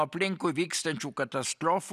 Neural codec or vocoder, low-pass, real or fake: none; 14.4 kHz; real